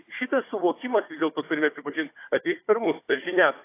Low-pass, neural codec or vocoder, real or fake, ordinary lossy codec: 3.6 kHz; codec, 16 kHz, 4 kbps, FunCodec, trained on Chinese and English, 50 frames a second; fake; AAC, 24 kbps